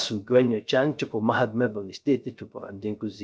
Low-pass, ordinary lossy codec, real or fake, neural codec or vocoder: none; none; fake; codec, 16 kHz, 0.3 kbps, FocalCodec